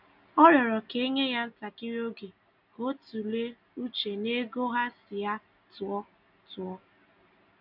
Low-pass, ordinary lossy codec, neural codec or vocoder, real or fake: 5.4 kHz; none; none; real